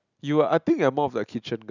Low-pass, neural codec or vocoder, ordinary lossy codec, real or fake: 7.2 kHz; none; none; real